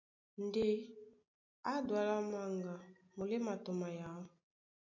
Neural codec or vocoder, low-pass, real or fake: none; 7.2 kHz; real